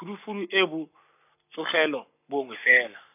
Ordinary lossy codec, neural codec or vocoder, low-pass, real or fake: none; codec, 44.1 kHz, 7.8 kbps, Pupu-Codec; 3.6 kHz; fake